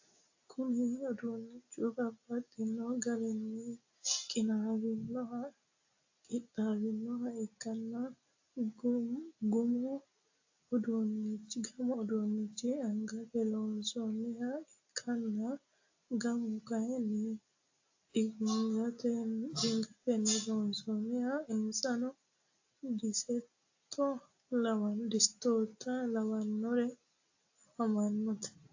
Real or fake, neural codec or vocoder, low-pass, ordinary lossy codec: real; none; 7.2 kHz; AAC, 48 kbps